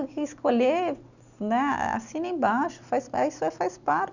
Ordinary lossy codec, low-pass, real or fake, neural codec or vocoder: none; 7.2 kHz; real; none